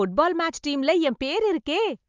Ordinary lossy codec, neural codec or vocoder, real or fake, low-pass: Opus, 32 kbps; none; real; 7.2 kHz